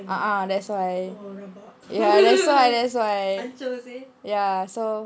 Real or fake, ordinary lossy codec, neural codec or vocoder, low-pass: real; none; none; none